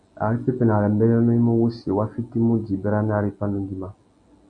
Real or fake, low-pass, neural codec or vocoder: real; 9.9 kHz; none